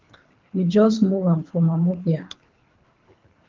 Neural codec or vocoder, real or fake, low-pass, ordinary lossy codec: codec, 24 kHz, 3 kbps, HILCodec; fake; 7.2 kHz; Opus, 24 kbps